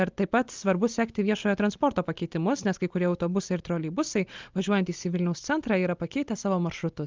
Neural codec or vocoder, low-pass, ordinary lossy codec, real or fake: none; 7.2 kHz; Opus, 32 kbps; real